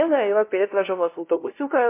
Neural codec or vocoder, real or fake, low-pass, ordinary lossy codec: codec, 16 kHz, 0.5 kbps, FunCodec, trained on LibriTTS, 25 frames a second; fake; 3.6 kHz; MP3, 24 kbps